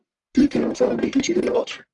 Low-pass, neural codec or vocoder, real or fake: 10.8 kHz; codec, 44.1 kHz, 1.7 kbps, Pupu-Codec; fake